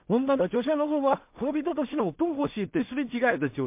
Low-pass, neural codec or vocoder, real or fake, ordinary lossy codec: 3.6 kHz; codec, 16 kHz in and 24 kHz out, 0.4 kbps, LongCat-Audio-Codec, two codebook decoder; fake; MP3, 32 kbps